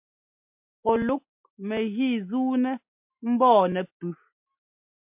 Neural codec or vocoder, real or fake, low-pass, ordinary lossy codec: none; real; 3.6 kHz; MP3, 32 kbps